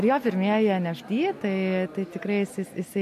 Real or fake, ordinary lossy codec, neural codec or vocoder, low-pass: real; MP3, 64 kbps; none; 14.4 kHz